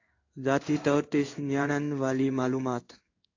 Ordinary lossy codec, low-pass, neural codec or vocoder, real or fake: AAC, 48 kbps; 7.2 kHz; codec, 16 kHz in and 24 kHz out, 1 kbps, XY-Tokenizer; fake